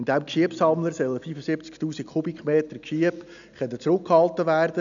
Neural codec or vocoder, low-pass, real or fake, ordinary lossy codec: none; 7.2 kHz; real; none